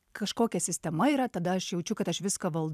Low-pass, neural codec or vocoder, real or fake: 14.4 kHz; vocoder, 44.1 kHz, 128 mel bands every 256 samples, BigVGAN v2; fake